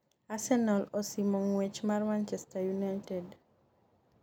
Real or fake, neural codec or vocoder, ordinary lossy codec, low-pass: real; none; none; 19.8 kHz